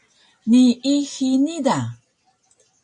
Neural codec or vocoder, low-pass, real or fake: none; 10.8 kHz; real